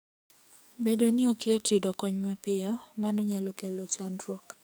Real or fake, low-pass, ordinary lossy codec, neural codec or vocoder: fake; none; none; codec, 44.1 kHz, 2.6 kbps, SNAC